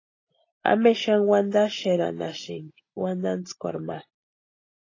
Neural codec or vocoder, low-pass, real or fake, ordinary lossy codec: none; 7.2 kHz; real; AAC, 32 kbps